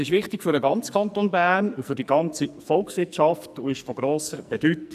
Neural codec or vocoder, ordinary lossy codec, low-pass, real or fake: codec, 44.1 kHz, 2.6 kbps, SNAC; none; 14.4 kHz; fake